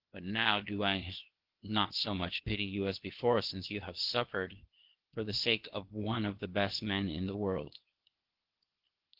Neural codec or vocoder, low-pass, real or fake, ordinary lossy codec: codec, 16 kHz, 0.8 kbps, ZipCodec; 5.4 kHz; fake; Opus, 16 kbps